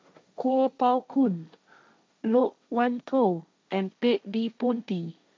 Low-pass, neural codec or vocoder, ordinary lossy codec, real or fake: none; codec, 16 kHz, 1.1 kbps, Voila-Tokenizer; none; fake